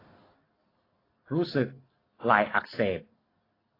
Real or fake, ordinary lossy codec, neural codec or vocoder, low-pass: real; AAC, 24 kbps; none; 5.4 kHz